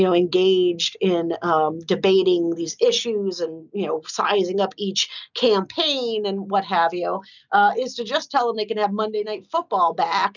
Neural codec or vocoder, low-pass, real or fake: none; 7.2 kHz; real